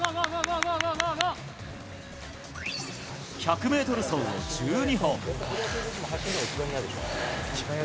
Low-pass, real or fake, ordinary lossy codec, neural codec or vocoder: none; real; none; none